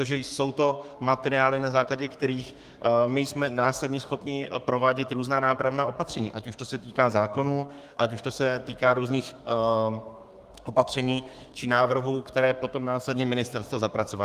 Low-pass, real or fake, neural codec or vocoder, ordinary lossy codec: 14.4 kHz; fake; codec, 32 kHz, 1.9 kbps, SNAC; Opus, 32 kbps